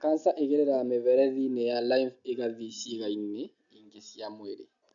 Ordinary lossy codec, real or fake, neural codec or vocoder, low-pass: none; real; none; 7.2 kHz